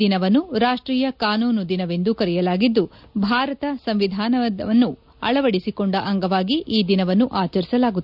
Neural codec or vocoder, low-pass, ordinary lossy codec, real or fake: none; 5.4 kHz; none; real